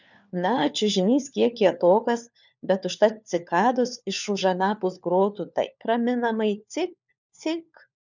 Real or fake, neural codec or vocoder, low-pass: fake; codec, 16 kHz, 4 kbps, FunCodec, trained on LibriTTS, 50 frames a second; 7.2 kHz